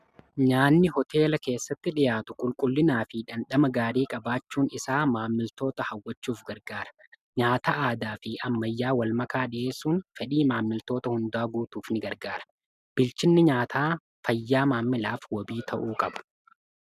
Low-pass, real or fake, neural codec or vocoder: 14.4 kHz; real; none